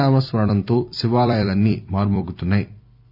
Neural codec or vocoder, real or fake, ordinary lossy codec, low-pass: vocoder, 44.1 kHz, 128 mel bands every 256 samples, BigVGAN v2; fake; none; 5.4 kHz